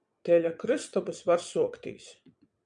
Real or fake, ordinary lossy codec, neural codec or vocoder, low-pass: fake; MP3, 96 kbps; vocoder, 22.05 kHz, 80 mel bands, WaveNeXt; 9.9 kHz